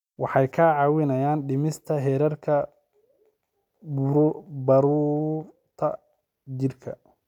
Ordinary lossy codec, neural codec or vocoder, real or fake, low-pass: none; none; real; 19.8 kHz